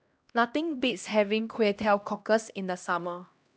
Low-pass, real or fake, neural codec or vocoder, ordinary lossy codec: none; fake; codec, 16 kHz, 1 kbps, X-Codec, HuBERT features, trained on LibriSpeech; none